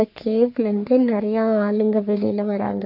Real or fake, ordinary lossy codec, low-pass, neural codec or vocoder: fake; none; 5.4 kHz; codec, 44.1 kHz, 3.4 kbps, Pupu-Codec